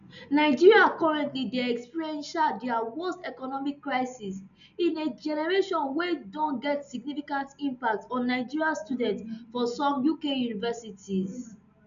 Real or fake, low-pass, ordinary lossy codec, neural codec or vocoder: real; 7.2 kHz; none; none